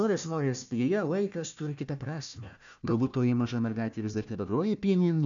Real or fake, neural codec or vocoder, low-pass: fake; codec, 16 kHz, 1 kbps, FunCodec, trained on Chinese and English, 50 frames a second; 7.2 kHz